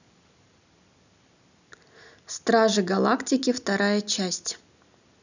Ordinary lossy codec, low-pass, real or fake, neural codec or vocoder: none; 7.2 kHz; real; none